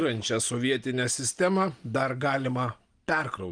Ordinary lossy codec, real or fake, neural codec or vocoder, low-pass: Opus, 32 kbps; real; none; 9.9 kHz